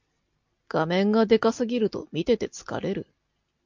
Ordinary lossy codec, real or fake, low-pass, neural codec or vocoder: MP3, 64 kbps; real; 7.2 kHz; none